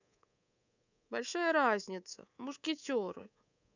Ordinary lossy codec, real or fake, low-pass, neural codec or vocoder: none; fake; 7.2 kHz; codec, 24 kHz, 3.1 kbps, DualCodec